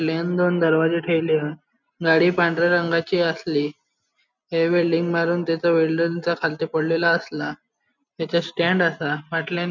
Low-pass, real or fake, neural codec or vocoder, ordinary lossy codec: 7.2 kHz; real; none; none